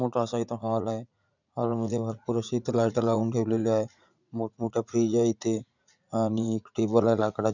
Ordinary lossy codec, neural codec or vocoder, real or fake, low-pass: none; vocoder, 44.1 kHz, 80 mel bands, Vocos; fake; 7.2 kHz